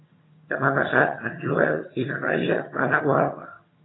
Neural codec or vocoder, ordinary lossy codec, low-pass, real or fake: vocoder, 22.05 kHz, 80 mel bands, HiFi-GAN; AAC, 16 kbps; 7.2 kHz; fake